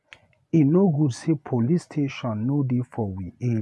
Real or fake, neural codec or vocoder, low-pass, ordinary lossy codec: fake; vocoder, 24 kHz, 100 mel bands, Vocos; none; none